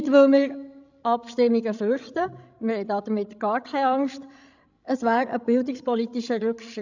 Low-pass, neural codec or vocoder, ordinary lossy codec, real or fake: 7.2 kHz; codec, 16 kHz, 16 kbps, FreqCodec, larger model; none; fake